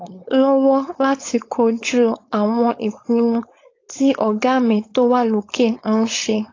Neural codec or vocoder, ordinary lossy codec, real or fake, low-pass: codec, 16 kHz, 4.8 kbps, FACodec; AAC, 32 kbps; fake; 7.2 kHz